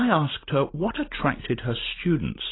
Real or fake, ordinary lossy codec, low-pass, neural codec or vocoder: real; AAC, 16 kbps; 7.2 kHz; none